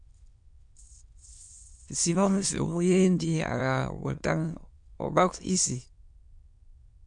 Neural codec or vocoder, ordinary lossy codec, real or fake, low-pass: autoencoder, 22.05 kHz, a latent of 192 numbers a frame, VITS, trained on many speakers; MP3, 64 kbps; fake; 9.9 kHz